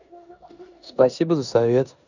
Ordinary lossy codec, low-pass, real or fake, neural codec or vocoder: none; 7.2 kHz; fake; codec, 16 kHz in and 24 kHz out, 0.9 kbps, LongCat-Audio-Codec, four codebook decoder